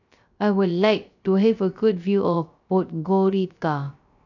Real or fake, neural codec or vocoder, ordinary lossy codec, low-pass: fake; codec, 16 kHz, 0.3 kbps, FocalCodec; none; 7.2 kHz